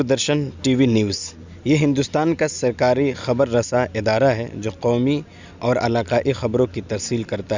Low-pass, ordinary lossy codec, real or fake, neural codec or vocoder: 7.2 kHz; Opus, 64 kbps; real; none